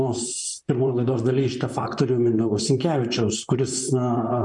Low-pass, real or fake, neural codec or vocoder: 10.8 kHz; fake; vocoder, 44.1 kHz, 128 mel bands, Pupu-Vocoder